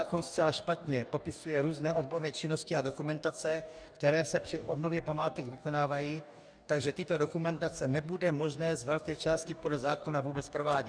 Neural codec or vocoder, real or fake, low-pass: codec, 44.1 kHz, 2.6 kbps, DAC; fake; 9.9 kHz